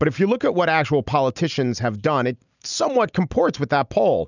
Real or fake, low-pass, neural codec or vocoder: real; 7.2 kHz; none